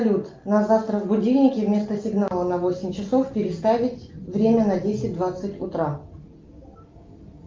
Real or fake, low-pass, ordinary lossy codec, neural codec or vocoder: real; 7.2 kHz; Opus, 24 kbps; none